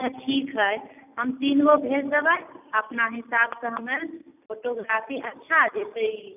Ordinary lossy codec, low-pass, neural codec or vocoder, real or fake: none; 3.6 kHz; none; real